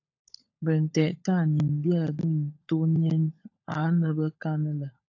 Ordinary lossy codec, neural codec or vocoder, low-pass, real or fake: AAC, 48 kbps; codec, 16 kHz, 16 kbps, FunCodec, trained on LibriTTS, 50 frames a second; 7.2 kHz; fake